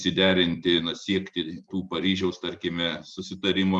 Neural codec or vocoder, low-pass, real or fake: none; 10.8 kHz; real